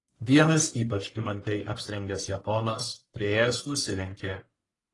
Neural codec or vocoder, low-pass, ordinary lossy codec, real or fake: codec, 44.1 kHz, 1.7 kbps, Pupu-Codec; 10.8 kHz; AAC, 32 kbps; fake